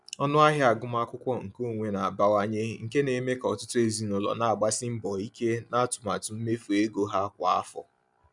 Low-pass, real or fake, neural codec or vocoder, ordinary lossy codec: 10.8 kHz; real; none; none